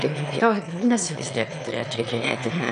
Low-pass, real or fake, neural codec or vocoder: 9.9 kHz; fake; autoencoder, 22.05 kHz, a latent of 192 numbers a frame, VITS, trained on one speaker